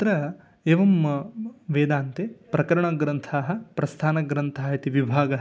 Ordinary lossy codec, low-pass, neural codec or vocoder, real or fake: none; none; none; real